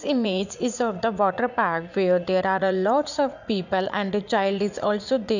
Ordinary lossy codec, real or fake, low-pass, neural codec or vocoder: none; fake; 7.2 kHz; vocoder, 44.1 kHz, 80 mel bands, Vocos